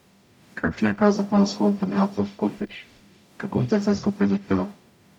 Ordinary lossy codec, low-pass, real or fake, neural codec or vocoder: none; 19.8 kHz; fake; codec, 44.1 kHz, 0.9 kbps, DAC